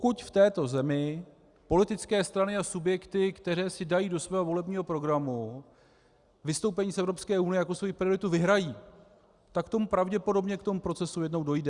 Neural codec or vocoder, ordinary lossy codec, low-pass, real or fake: none; Opus, 64 kbps; 10.8 kHz; real